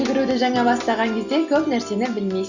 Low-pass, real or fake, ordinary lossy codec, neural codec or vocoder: 7.2 kHz; real; Opus, 64 kbps; none